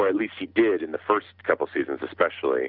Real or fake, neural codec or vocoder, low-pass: real; none; 5.4 kHz